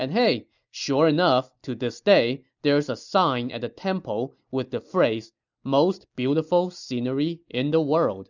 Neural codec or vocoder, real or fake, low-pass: none; real; 7.2 kHz